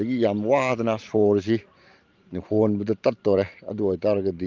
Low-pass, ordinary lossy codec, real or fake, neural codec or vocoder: 7.2 kHz; Opus, 32 kbps; fake; vocoder, 44.1 kHz, 128 mel bands every 512 samples, BigVGAN v2